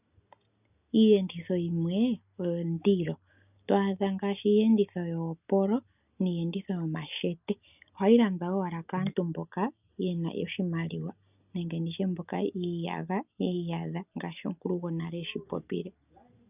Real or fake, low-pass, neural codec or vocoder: real; 3.6 kHz; none